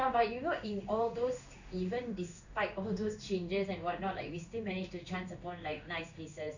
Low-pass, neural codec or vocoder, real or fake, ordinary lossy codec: 7.2 kHz; codec, 16 kHz in and 24 kHz out, 1 kbps, XY-Tokenizer; fake; none